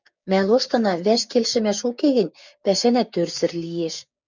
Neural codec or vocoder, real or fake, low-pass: codec, 16 kHz, 6 kbps, DAC; fake; 7.2 kHz